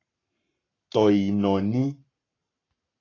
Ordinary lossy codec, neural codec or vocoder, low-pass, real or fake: AAC, 32 kbps; codec, 44.1 kHz, 7.8 kbps, Pupu-Codec; 7.2 kHz; fake